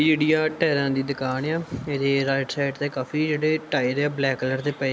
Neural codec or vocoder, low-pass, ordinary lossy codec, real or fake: none; none; none; real